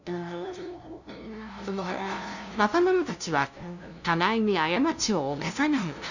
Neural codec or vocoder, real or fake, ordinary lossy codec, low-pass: codec, 16 kHz, 0.5 kbps, FunCodec, trained on LibriTTS, 25 frames a second; fake; none; 7.2 kHz